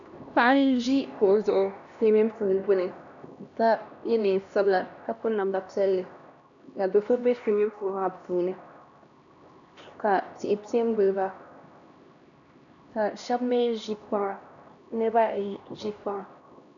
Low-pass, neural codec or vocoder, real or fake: 7.2 kHz; codec, 16 kHz, 1 kbps, X-Codec, HuBERT features, trained on LibriSpeech; fake